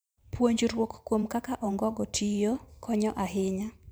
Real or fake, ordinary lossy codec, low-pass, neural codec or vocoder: fake; none; none; vocoder, 44.1 kHz, 128 mel bands every 512 samples, BigVGAN v2